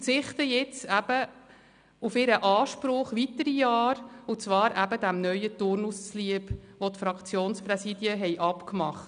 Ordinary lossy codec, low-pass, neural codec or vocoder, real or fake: none; 9.9 kHz; none; real